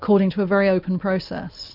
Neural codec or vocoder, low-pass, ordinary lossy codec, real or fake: none; 5.4 kHz; MP3, 48 kbps; real